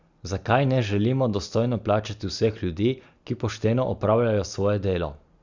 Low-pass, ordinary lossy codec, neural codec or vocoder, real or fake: 7.2 kHz; Opus, 64 kbps; none; real